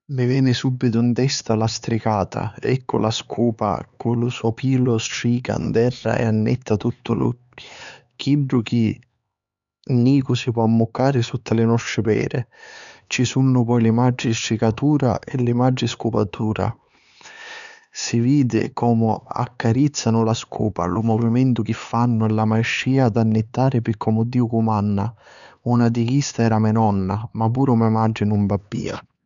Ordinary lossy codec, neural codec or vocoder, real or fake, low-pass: none; codec, 16 kHz, 4 kbps, X-Codec, HuBERT features, trained on LibriSpeech; fake; 7.2 kHz